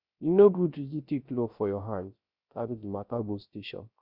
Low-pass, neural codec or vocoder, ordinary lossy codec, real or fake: 5.4 kHz; codec, 16 kHz, 0.3 kbps, FocalCodec; Opus, 64 kbps; fake